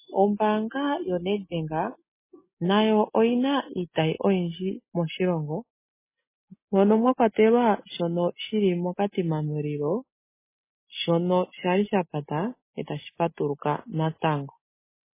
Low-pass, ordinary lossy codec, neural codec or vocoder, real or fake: 3.6 kHz; MP3, 16 kbps; none; real